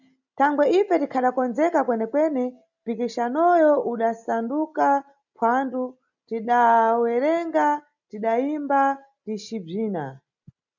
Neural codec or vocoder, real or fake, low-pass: none; real; 7.2 kHz